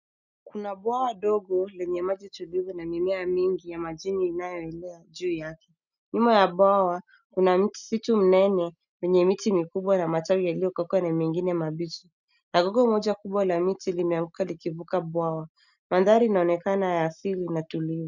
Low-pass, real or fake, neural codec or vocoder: 7.2 kHz; real; none